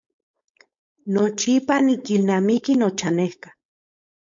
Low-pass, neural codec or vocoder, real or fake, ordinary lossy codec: 7.2 kHz; codec, 16 kHz, 4.8 kbps, FACodec; fake; MP3, 48 kbps